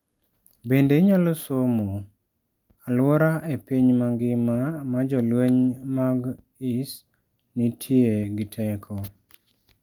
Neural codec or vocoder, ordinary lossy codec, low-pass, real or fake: none; Opus, 32 kbps; 19.8 kHz; real